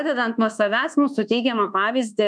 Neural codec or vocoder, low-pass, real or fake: codec, 24 kHz, 1.2 kbps, DualCodec; 9.9 kHz; fake